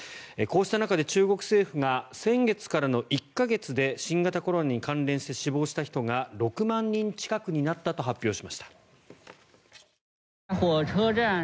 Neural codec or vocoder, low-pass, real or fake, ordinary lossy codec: none; none; real; none